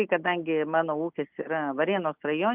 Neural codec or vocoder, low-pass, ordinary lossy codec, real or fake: none; 3.6 kHz; Opus, 24 kbps; real